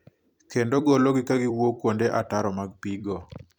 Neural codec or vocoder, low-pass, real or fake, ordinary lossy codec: vocoder, 44.1 kHz, 128 mel bands every 256 samples, BigVGAN v2; 19.8 kHz; fake; none